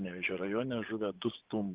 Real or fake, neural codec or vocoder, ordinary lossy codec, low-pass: fake; vocoder, 24 kHz, 100 mel bands, Vocos; Opus, 32 kbps; 3.6 kHz